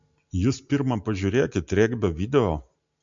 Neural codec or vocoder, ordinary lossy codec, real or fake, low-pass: none; MP3, 64 kbps; real; 7.2 kHz